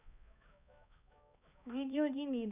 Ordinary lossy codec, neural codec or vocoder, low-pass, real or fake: none; codec, 16 kHz, 2 kbps, X-Codec, HuBERT features, trained on balanced general audio; 3.6 kHz; fake